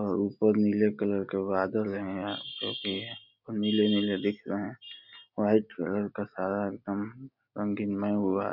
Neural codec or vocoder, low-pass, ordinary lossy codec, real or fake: none; 5.4 kHz; none; real